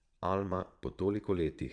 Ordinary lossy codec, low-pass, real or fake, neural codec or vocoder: none; 9.9 kHz; fake; vocoder, 22.05 kHz, 80 mel bands, Vocos